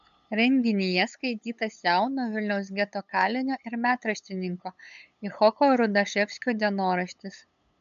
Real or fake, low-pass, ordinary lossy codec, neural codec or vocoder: fake; 7.2 kHz; MP3, 96 kbps; codec, 16 kHz, 8 kbps, FunCodec, trained on LibriTTS, 25 frames a second